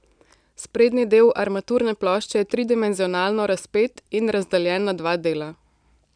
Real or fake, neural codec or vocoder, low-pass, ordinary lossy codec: real; none; 9.9 kHz; none